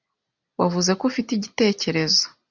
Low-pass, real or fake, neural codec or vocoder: 7.2 kHz; real; none